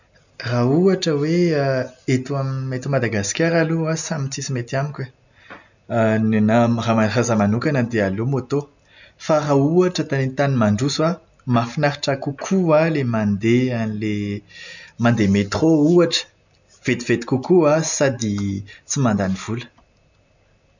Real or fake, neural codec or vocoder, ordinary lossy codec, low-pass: real; none; none; 7.2 kHz